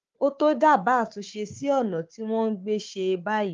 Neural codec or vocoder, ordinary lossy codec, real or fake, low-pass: codec, 16 kHz, 4 kbps, FunCodec, trained on Chinese and English, 50 frames a second; Opus, 32 kbps; fake; 7.2 kHz